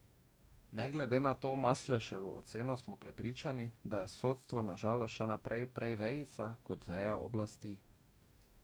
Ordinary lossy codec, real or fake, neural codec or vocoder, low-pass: none; fake; codec, 44.1 kHz, 2.6 kbps, DAC; none